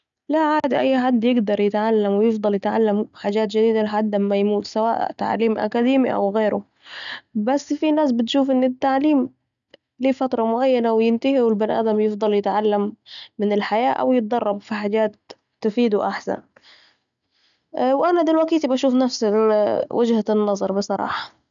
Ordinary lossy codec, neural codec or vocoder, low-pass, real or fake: none; none; 7.2 kHz; real